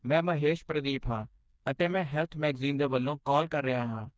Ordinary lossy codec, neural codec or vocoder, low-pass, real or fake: none; codec, 16 kHz, 2 kbps, FreqCodec, smaller model; none; fake